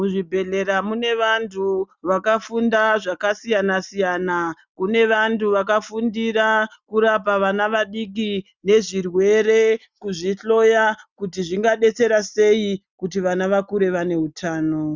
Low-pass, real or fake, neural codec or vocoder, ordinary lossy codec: 7.2 kHz; real; none; Opus, 64 kbps